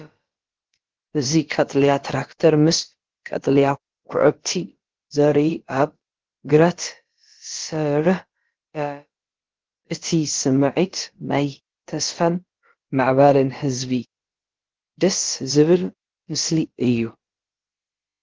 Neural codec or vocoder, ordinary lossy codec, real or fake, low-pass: codec, 16 kHz, about 1 kbps, DyCAST, with the encoder's durations; Opus, 16 kbps; fake; 7.2 kHz